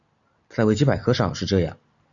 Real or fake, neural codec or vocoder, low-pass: real; none; 7.2 kHz